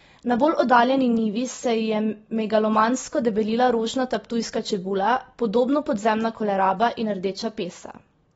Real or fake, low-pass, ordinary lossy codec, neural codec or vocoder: real; 19.8 kHz; AAC, 24 kbps; none